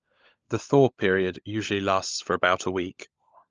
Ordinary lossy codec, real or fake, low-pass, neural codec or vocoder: Opus, 24 kbps; fake; 7.2 kHz; codec, 16 kHz, 16 kbps, FunCodec, trained on LibriTTS, 50 frames a second